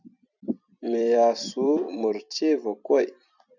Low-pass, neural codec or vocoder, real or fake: 7.2 kHz; vocoder, 44.1 kHz, 128 mel bands every 256 samples, BigVGAN v2; fake